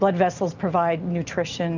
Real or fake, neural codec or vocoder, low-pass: real; none; 7.2 kHz